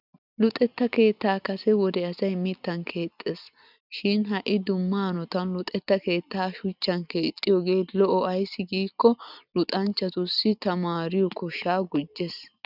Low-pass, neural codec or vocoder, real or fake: 5.4 kHz; none; real